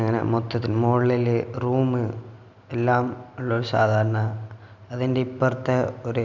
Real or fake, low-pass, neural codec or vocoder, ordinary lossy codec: real; 7.2 kHz; none; none